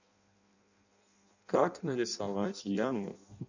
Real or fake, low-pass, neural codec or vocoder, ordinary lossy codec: fake; 7.2 kHz; codec, 16 kHz in and 24 kHz out, 0.6 kbps, FireRedTTS-2 codec; none